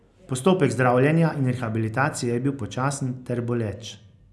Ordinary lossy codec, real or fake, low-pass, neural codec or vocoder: none; real; none; none